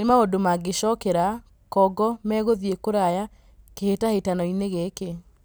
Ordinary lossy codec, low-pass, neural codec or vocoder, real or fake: none; none; none; real